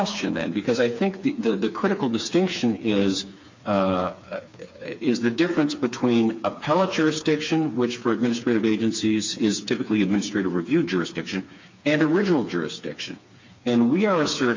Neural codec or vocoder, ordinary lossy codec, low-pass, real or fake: codec, 16 kHz, 4 kbps, FreqCodec, smaller model; MP3, 48 kbps; 7.2 kHz; fake